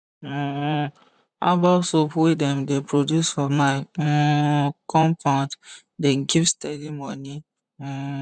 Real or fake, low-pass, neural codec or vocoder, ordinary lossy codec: fake; 9.9 kHz; vocoder, 44.1 kHz, 128 mel bands, Pupu-Vocoder; none